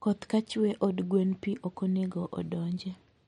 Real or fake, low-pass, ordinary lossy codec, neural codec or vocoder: real; 19.8 kHz; MP3, 48 kbps; none